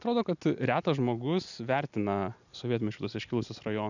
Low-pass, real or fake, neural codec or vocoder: 7.2 kHz; real; none